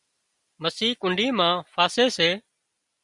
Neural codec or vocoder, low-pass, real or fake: none; 10.8 kHz; real